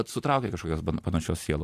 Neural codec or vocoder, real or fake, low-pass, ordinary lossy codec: none; real; 14.4 kHz; AAC, 64 kbps